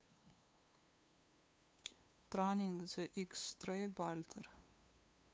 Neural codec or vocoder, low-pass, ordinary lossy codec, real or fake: codec, 16 kHz, 2 kbps, FunCodec, trained on LibriTTS, 25 frames a second; none; none; fake